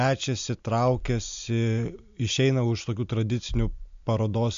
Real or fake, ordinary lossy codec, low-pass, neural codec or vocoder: real; AAC, 64 kbps; 7.2 kHz; none